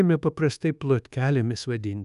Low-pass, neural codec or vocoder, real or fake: 10.8 kHz; codec, 24 kHz, 1.2 kbps, DualCodec; fake